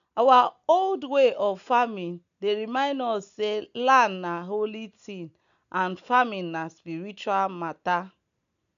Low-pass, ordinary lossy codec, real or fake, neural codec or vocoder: 7.2 kHz; none; real; none